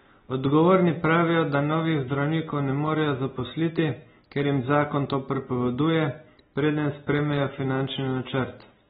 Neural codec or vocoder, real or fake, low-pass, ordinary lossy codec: none; real; 7.2 kHz; AAC, 16 kbps